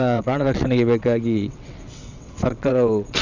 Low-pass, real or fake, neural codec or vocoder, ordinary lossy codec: 7.2 kHz; fake; vocoder, 22.05 kHz, 80 mel bands, WaveNeXt; none